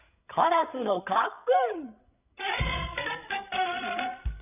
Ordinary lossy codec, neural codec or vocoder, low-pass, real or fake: none; codec, 16 kHz, 8 kbps, FreqCodec, larger model; 3.6 kHz; fake